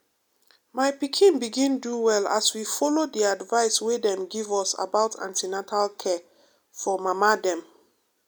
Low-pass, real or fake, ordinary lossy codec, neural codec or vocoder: none; real; none; none